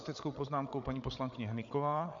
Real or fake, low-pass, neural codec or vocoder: fake; 7.2 kHz; codec, 16 kHz, 8 kbps, FreqCodec, larger model